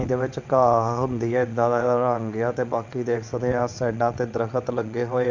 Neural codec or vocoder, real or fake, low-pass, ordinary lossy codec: vocoder, 22.05 kHz, 80 mel bands, WaveNeXt; fake; 7.2 kHz; MP3, 64 kbps